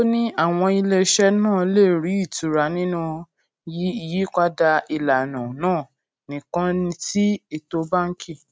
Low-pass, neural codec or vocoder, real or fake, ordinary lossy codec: none; none; real; none